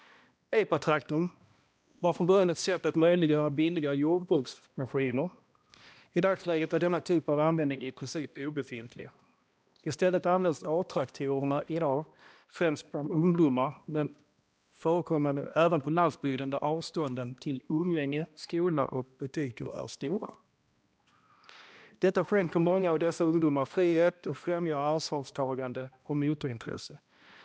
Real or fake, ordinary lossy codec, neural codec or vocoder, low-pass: fake; none; codec, 16 kHz, 1 kbps, X-Codec, HuBERT features, trained on balanced general audio; none